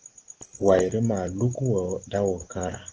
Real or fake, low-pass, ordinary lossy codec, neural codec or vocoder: real; 7.2 kHz; Opus, 16 kbps; none